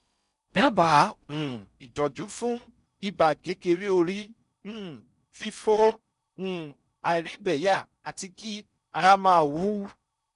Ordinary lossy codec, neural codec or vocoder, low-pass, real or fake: none; codec, 16 kHz in and 24 kHz out, 0.6 kbps, FocalCodec, streaming, 4096 codes; 10.8 kHz; fake